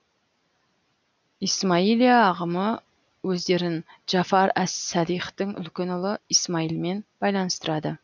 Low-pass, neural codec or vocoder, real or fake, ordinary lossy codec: 7.2 kHz; none; real; none